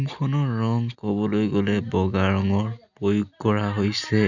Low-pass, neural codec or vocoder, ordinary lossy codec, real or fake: 7.2 kHz; none; none; real